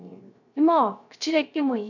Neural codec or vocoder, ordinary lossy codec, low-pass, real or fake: codec, 16 kHz, 0.3 kbps, FocalCodec; none; 7.2 kHz; fake